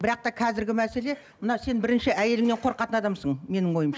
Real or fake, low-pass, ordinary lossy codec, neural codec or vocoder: real; none; none; none